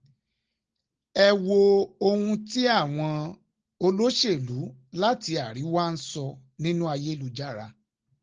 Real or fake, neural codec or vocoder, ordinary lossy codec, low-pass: real; none; Opus, 16 kbps; 7.2 kHz